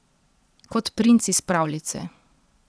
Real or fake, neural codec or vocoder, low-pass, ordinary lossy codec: fake; vocoder, 22.05 kHz, 80 mel bands, Vocos; none; none